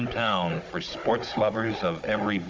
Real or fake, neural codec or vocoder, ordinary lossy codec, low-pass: fake; codec, 16 kHz, 16 kbps, FunCodec, trained on Chinese and English, 50 frames a second; Opus, 32 kbps; 7.2 kHz